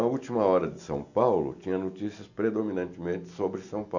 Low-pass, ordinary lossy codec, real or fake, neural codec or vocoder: 7.2 kHz; none; real; none